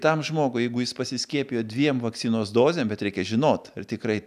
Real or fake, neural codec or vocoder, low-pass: real; none; 14.4 kHz